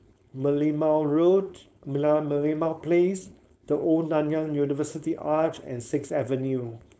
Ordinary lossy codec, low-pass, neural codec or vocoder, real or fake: none; none; codec, 16 kHz, 4.8 kbps, FACodec; fake